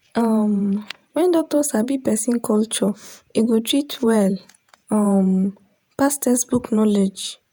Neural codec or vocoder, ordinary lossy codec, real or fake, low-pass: vocoder, 48 kHz, 128 mel bands, Vocos; none; fake; none